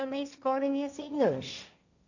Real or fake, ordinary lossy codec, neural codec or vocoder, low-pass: fake; none; codec, 16 kHz, 1.1 kbps, Voila-Tokenizer; 7.2 kHz